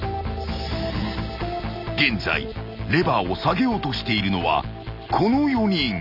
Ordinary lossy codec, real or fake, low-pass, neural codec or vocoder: none; real; 5.4 kHz; none